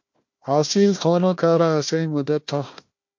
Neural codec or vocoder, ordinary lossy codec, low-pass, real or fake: codec, 16 kHz, 1 kbps, FunCodec, trained on Chinese and English, 50 frames a second; MP3, 48 kbps; 7.2 kHz; fake